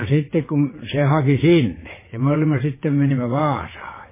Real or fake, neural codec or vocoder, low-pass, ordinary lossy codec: fake; vocoder, 22.05 kHz, 80 mel bands, WaveNeXt; 3.6 kHz; MP3, 16 kbps